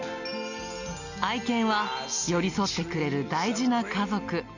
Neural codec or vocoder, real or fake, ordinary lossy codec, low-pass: none; real; none; 7.2 kHz